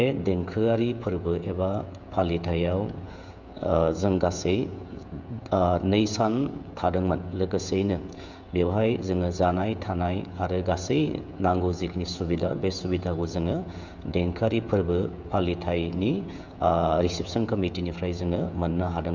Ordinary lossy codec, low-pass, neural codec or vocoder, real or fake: none; 7.2 kHz; codec, 16 kHz, 16 kbps, FreqCodec, smaller model; fake